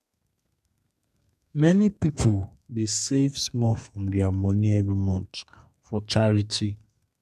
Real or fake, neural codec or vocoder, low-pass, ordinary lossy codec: fake; codec, 32 kHz, 1.9 kbps, SNAC; 14.4 kHz; none